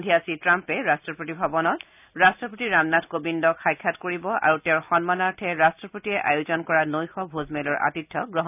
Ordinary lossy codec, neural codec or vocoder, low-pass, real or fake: none; none; 3.6 kHz; real